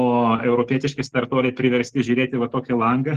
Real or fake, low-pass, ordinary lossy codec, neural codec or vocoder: real; 14.4 kHz; Opus, 16 kbps; none